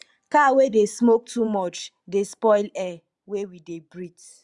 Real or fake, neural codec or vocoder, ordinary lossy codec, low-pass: fake; vocoder, 44.1 kHz, 128 mel bands every 512 samples, BigVGAN v2; Opus, 64 kbps; 10.8 kHz